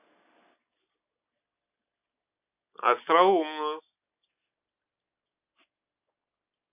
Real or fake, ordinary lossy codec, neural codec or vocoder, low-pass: real; none; none; 3.6 kHz